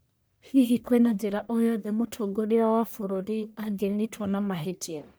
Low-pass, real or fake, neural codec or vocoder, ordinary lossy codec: none; fake; codec, 44.1 kHz, 1.7 kbps, Pupu-Codec; none